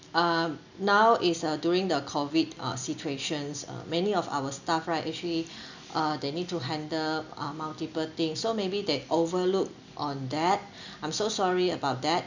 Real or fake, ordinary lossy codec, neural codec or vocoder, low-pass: real; none; none; 7.2 kHz